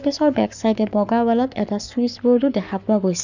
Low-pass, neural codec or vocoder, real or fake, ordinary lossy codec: 7.2 kHz; codec, 44.1 kHz, 7.8 kbps, Pupu-Codec; fake; none